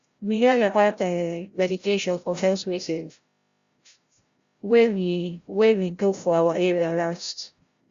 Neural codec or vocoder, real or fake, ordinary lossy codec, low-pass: codec, 16 kHz, 0.5 kbps, FreqCodec, larger model; fake; Opus, 64 kbps; 7.2 kHz